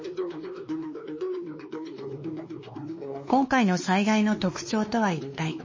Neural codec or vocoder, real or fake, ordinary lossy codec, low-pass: codec, 16 kHz, 2 kbps, X-Codec, WavLM features, trained on Multilingual LibriSpeech; fake; MP3, 32 kbps; 7.2 kHz